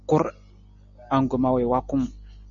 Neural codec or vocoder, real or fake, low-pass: none; real; 7.2 kHz